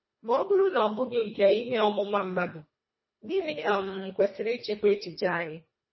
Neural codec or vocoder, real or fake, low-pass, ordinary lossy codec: codec, 24 kHz, 1.5 kbps, HILCodec; fake; 7.2 kHz; MP3, 24 kbps